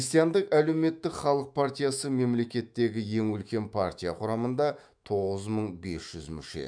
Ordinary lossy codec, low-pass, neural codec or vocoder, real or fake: none; 9.9 kHz; autoencoder, 48 kHz, 128 numbers a frame, DAC-VAE, trained on Japanese speech; fake